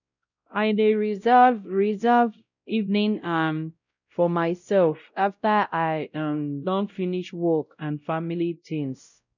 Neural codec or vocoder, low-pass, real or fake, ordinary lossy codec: codec, 16 kHz, 0.5 kbps, X-Codec, WavLM features, trained on Multilingual LibriSpeech; 7.2 kHz; fake; none